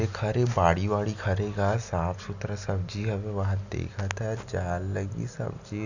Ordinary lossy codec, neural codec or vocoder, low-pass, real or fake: none; none; 7.2 kHz; real